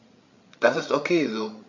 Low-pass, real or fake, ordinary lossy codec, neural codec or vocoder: 7.2 kHz; fake; MP3, 48 kbps; codec, 16 kHz, 16 kbps, FreqCodec, larger model